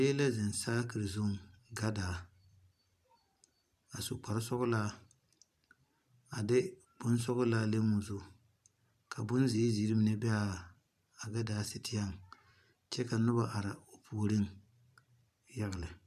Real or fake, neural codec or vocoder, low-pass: fake; vocoder, 44.1 kHz, 128 mel bands every 512 samples, BigVGAN v2; 14.4 kHz